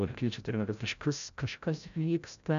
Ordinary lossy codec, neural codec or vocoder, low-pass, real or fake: AAC, 96 kbps; codec, 16 kHz, 0.5 kbps, FreqCodec, larger model; 7.2 kHz; fake